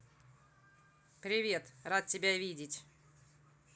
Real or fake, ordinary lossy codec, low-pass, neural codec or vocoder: real; none; none; none